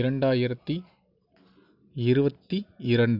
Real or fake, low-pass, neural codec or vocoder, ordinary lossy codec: real; 5.4 kHz; none; none